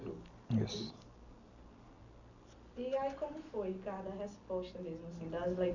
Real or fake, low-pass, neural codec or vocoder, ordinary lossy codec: real; 7.2 kHz; none; none